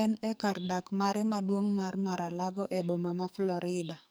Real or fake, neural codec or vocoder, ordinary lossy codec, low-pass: fake; codec, 44.1 kHz, 2.6 kbps, SNAC; none; none